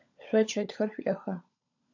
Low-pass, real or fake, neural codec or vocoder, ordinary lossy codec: 7.2 kHz; fake; codec, 16 kHz, 16 kbps, FunCodec, trained on LibriTTS, 50 frames a second; AAC, 48 kbps